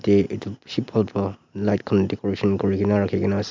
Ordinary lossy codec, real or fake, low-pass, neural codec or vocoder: MP3, 64 kbps; real; 7.2 kHz; none